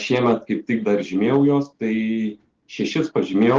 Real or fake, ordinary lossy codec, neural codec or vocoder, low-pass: real; Opus, 16 kbps; none; 7.2 kHz